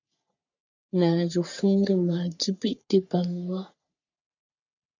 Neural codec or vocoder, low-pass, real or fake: codec, 44.1 kHz, 3.4 kbps, Pupu-Codec; 7.2 kHz; fake